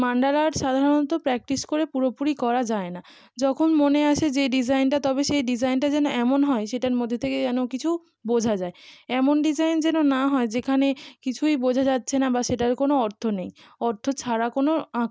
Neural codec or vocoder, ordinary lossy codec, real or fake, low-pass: none; none; real; none